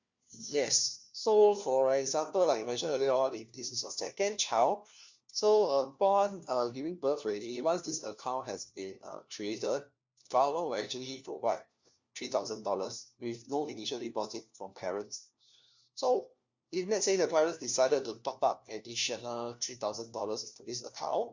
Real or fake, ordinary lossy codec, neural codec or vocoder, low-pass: fake; Opus, 64 kbps; codec, 16 kHz, 1 kbps, FunCodec, trained on LibriTTS, 50 frames a second; 7.2 kHz